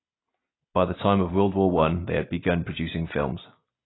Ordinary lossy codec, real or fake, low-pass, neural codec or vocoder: AAC, 16 kbps; real; 7.2 kHz; none